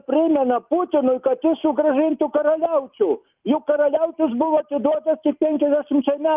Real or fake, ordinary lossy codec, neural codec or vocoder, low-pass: real; Opus, 24 kbps; none; 3.6 kHz